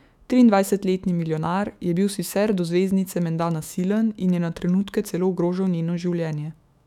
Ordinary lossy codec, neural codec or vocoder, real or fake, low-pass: none; autoencoder, 48 kHz, 128 numbers a frame, DAC-VAE, trained on Japanese speech; fake; 19.8 kHz